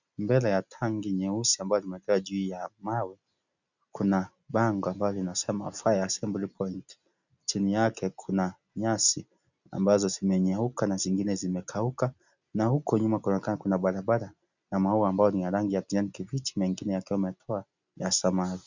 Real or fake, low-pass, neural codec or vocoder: real; 7.2 kHz; none